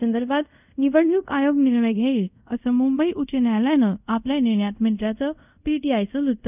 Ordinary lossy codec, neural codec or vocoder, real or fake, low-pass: none; codec, 24 kHz, 0.5 kbps, DualCodec; fake; 3.6 kHz